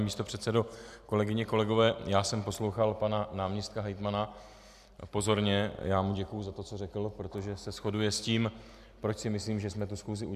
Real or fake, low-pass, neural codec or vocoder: real; 14.4 kHz; none